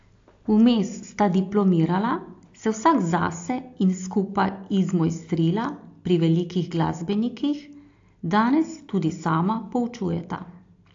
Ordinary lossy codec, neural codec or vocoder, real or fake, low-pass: AAC, 48 kbps; none; real; 7.2 kHz